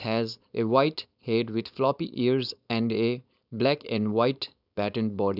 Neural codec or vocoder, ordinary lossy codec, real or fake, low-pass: codec, 16 kHz, 4.8 kbps, FACodec; none; fake; 5.4 kHz